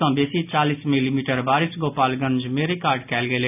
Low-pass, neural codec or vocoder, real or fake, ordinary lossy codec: 3.6 kHz; none; real; none